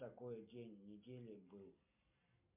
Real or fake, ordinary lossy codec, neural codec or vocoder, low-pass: real; MP3, 32 kbps; none; 3.6 kHz